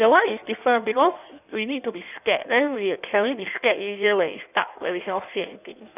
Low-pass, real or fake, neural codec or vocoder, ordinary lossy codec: 3.6 kHz; fake; codec, 16 kHz in and 24 kHz out, 1.1 kbps, FireRedTTS-2 codec; none